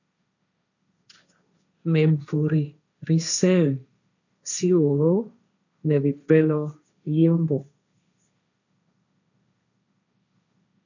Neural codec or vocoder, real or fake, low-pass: codec, 16 kHz, 1.1 kbps, Voila-Tokenizer; fake; 7.2 kHz